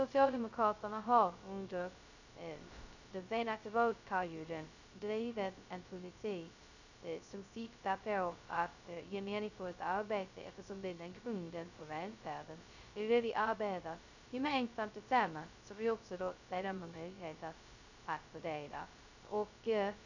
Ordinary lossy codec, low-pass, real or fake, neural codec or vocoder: none; 7.2 kHz; fake; codec, 16 kHz, 0.2 kbps, FocalCodec